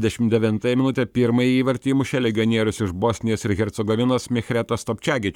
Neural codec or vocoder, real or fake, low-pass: codec, 44.1 kHz, 7.8 kbps, Pupu-Codec; fake; 19.8 kHz